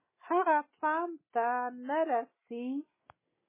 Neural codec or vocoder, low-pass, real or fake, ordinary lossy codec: none; 3.6 kHz; real; MP3, 16 kbps